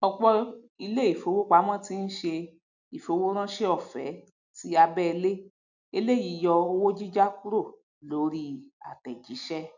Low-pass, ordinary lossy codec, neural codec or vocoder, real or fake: 7.2 kHz; none; none; real